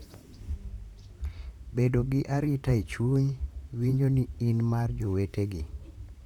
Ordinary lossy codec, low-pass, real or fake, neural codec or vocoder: none; 19.8 kHz; fake; vocoder, 44.1 kHz, 128 mel bands, Pupu-Vocoder